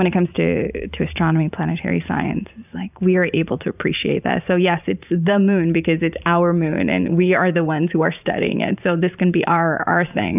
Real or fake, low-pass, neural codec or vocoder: fake; 3.6 kHz; vocoder, 44.1 kHz, 128 mel bands every 512 samples, BigVGAN v2